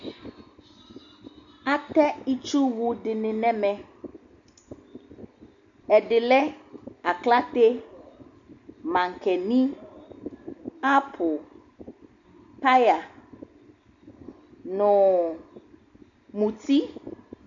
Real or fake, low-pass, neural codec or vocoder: real; 7.2 kHz; none